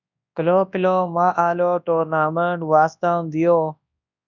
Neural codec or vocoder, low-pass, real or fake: codec, 24 kHz, 0.9 kbps, WavTokenizer, large speech release; 7.2 kHz; fake